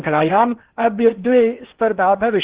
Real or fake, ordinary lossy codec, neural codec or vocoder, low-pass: fake; Opus, 16 kbps; codec, 16 kHz in and 24 kHz out, 0.8 kbps, FocalCodec, streaming, 65536 codes; 3.6 kHz